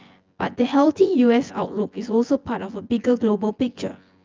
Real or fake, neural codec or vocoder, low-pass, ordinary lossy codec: fake; vocoder, 24 kHz, 100 mel bands, Vocos; 7.2 kHz; Opus, 24 kbps